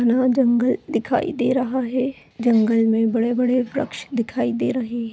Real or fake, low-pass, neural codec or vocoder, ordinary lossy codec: real; none; none; none